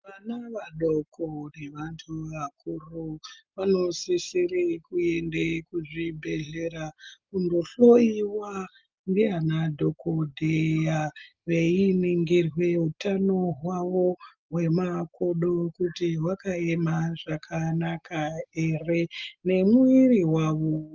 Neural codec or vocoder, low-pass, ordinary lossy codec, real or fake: none; 7.2 kHz; Opus, 24 kbps; real